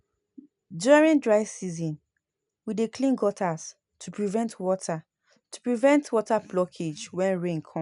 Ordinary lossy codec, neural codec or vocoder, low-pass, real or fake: MP3, 96 kbps; none; 10.8 kHz; real